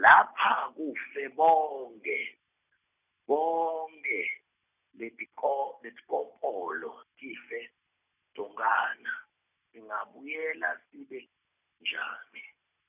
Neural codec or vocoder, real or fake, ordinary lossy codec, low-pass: none; real; none; 3.6 kHz